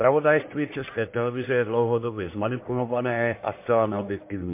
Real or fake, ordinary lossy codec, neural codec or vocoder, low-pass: fake; MP3, 24 kbps; codec, 44.1 kHz, 1.7 kbps, Pupu-Codec; 3.6 kHz